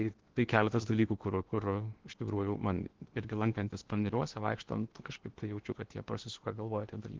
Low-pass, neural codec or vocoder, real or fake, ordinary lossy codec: 7.2 kHz; codec, 16 kHz in and 24 kHz out, 0.8 kbps, FocalCodec, streaming, 65536 codes; fake; Opus, 16 kbps